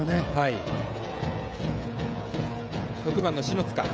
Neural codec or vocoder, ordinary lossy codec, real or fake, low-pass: codec, 16 kHz, 16 kbps, FreqCodec, smaller model; none; fake; none